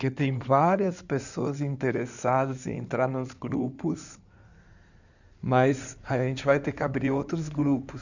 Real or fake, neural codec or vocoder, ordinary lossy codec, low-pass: fake; codec, 16 kHz in and 24 kHz out, 2.2 kbps, FireRedTTS-2 codec; none; 7.2 kHz